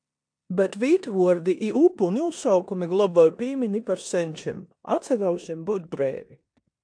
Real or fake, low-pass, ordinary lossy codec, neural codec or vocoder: fake; 9.9 kHz; AAC, 64 kbps; codec, 16 kHz in and 24 kHz out, 0.9 kbps, LongCat-Audio-Codec, four codebook decoder